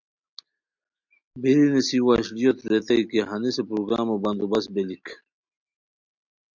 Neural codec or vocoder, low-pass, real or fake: none; 7.2 kHz; real